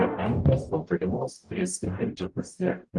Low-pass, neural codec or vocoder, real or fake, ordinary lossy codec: 10.8 kHz; codec, 44.1 kHz, 0.9 kbps, DAC; fake; Opus, 32 kbps